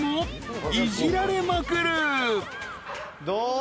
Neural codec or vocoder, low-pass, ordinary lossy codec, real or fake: none; none; none; real